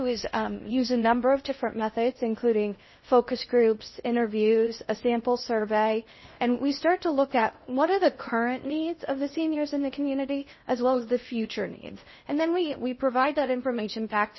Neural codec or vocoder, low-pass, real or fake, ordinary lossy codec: codec, 16 kHz in and 24 kHz out, 0.6 kbps, FocalCodec, streaming, 4096 codes; 7.2 kHz; fake; MP3, 24 kbps